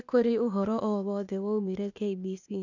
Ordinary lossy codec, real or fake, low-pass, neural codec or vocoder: none; fake; 7.2 kHz; codec, 16 kHz, 0.8 kbps, ZipCodec